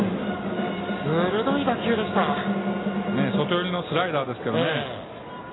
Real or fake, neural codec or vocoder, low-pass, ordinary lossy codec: real; none; 7.2 kHz; AAC, 16 kbps